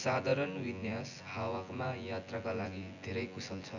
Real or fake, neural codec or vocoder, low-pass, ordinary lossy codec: fake; vocoder, 24 kHz, 100 mel bands, Vocos; 7.2 kHz; none